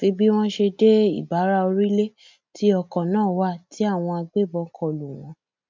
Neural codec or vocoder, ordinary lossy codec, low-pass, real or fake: none; MP3, 64 kbps; 7.2 kHz; real